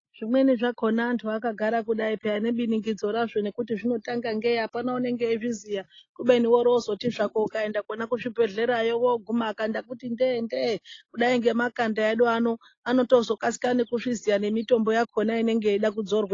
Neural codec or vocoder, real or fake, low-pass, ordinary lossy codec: none; real; 7.2 kHz; AAC, 32 kbps